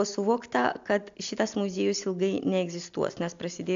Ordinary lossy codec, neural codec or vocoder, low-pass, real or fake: AAC, 64 kbps; none; 7.2 kHz; real